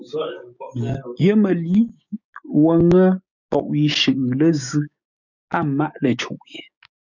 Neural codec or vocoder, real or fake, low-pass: codec, 16 kHz, 6 kbps, DAC; fake; 7.2 kHz